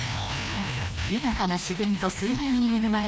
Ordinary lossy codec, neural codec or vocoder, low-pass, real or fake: none; codec, 16 kHz, 1 kbps, FreqCodec, larger model; none; fake